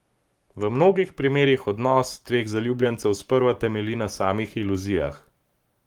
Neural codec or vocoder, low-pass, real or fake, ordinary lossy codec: codec, 44.1 kHz, 7.8 kbps, Pupu-Codec; 19.8 kHz; fake; Opus, 32 kbps